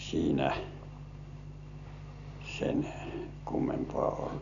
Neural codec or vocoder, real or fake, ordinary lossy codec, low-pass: none; real; none; 7.2 kHz